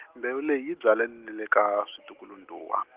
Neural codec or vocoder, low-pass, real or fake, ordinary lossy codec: none; 3.6 kHz; real; Opus, 16 kbps